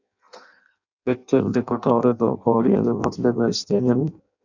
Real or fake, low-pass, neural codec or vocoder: fake; 7.2 kHz; codec, 16 kHz in and 24 kHz out, 0.6 kbps, FireRedTTS-2 codec